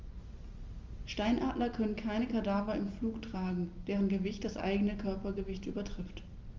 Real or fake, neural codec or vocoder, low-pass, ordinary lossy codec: real; none; 7.2 kHz; Opus, 32 kbps